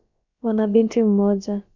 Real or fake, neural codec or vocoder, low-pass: fake; codec, 16 kHz, about 1 kbps, DyCAST, with the encoder's durations; 7.2 kHz